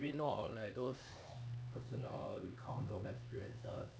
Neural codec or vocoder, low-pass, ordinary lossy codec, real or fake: codec, 16 kHz, 2 kbps, X-Codec, HuBERT features, trained on LibriSpeech; none; none; fake